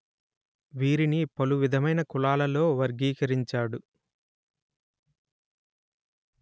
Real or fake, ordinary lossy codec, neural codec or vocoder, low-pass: real; none; none; none